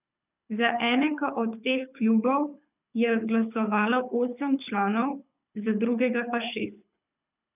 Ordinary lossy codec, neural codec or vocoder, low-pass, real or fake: none; codec, 24 kHz, 6 kbps, HILCodec; 3.6 kHz; fake